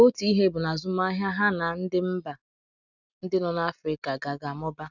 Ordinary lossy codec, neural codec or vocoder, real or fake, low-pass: none; none; real; 7.2 kHz